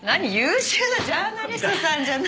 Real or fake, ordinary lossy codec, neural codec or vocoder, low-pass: real; none; none; none